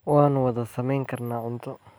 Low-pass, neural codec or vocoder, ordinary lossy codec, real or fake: none; none; none; real